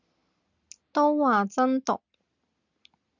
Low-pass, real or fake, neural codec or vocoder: 7.2 kHz; real; none